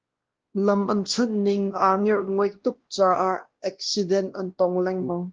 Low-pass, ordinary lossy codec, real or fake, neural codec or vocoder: 7.2 kHz; Opus, 16 kbps; fake; codec, 16 kHz, 1 kbps, X-Codec, WavLM features, trained on Multilingual LibriSpeech